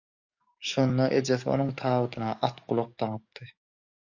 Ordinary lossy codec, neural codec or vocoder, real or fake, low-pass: MP3, 48 kbps; codec, 44.1 kHz, 7.8 kbps, DAC; fake; 7.2 kHz